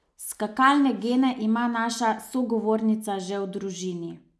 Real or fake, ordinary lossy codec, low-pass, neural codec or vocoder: real; none; none; none